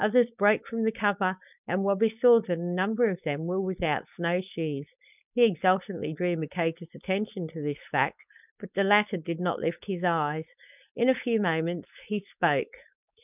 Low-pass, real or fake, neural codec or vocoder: 3.6 kHz; fake; codec, 16 kHz, 4.8 kbps, FACodec